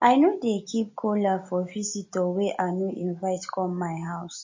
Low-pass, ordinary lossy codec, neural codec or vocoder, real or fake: 7.2 kHz; MP3, 32 kbps; none; real